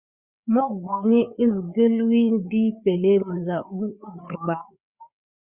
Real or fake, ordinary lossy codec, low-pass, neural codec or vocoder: fake; Opus, 64 kbps; 3.6 kHz; codec, 16 kHz, 4 kbps, FreqCodec, larger model